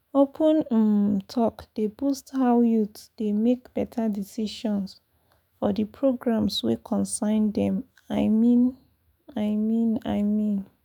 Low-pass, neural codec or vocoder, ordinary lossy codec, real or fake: 19.8 kHz; autoencoder, 48 kHz, 128 numbers a frame, DAC-VAE, trained on Japanese speech; none; fake